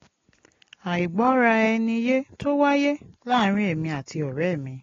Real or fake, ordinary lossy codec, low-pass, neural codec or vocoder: real; AAC, 32 kbps; 7.2 kHz; none